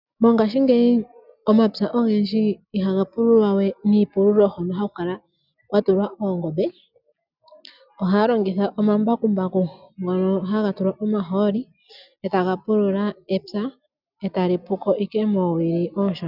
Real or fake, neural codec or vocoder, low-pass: real; none; 5.4 kHz